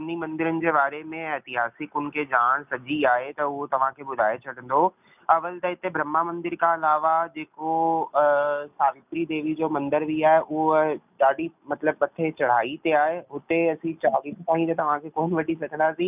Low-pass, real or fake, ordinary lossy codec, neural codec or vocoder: 3.6 kHz; real; none; none